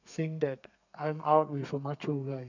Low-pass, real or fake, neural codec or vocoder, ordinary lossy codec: 7.2 kHz; fake; codec, 32 kHz, 1.9 kbps, SNAC; none